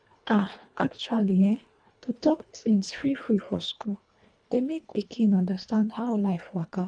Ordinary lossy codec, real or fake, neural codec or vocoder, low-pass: none; fake; codec, 24 kHz, 1.5 kbps, HILCodec; 9.9 kHz